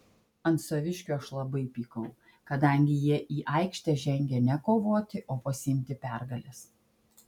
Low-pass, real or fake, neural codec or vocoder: 19.8 kHz; real; none